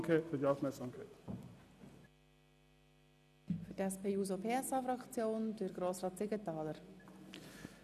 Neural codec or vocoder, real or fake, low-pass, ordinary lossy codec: none; real; 14.4 kHz; none